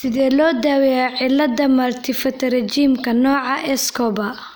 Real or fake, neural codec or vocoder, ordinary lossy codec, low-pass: real; none; none; none